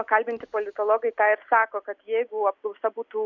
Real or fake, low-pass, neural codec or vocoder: real; 7.2 kHz; none